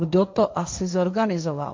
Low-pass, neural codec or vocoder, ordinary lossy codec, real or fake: 7.2 kHz; codec, 16 kHz, 1.1 kbps, Voila-Tokenizer; MP3, 64 kbps; fake